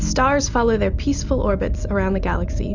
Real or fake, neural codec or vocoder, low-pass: real; none; 7.2 kHz